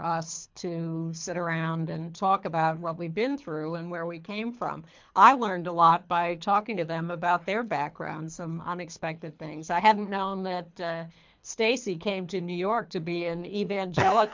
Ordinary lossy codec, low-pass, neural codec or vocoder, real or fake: MP3, 64 kbps; 7.2 kHz; codec, 24 kHz, 3 kbps, HILCodec; fake